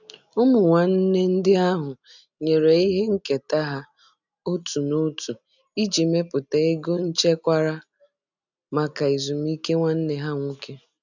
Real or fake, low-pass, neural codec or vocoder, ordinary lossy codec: real; 7.2 kHz; none; none